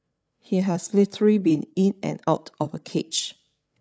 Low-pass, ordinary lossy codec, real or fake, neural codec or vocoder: none; none; fake; codec, 16 kHz, 8 kbps, FreqCodec, larger model